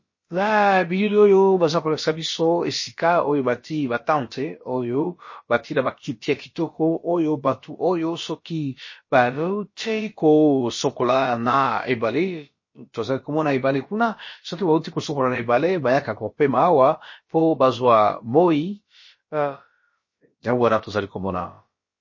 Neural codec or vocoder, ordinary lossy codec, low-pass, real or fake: codec, 16 kHz, about 1 kbps, DyCAST, with the encoder's durations; MP3, 32 kbps; 7.2 kHz; fake